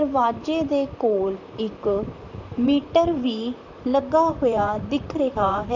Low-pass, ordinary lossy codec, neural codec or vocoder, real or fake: 7.2 kHz; none; vocoder, 44.1 kHz, 128 mel bands, Pupu-Vocoder; fake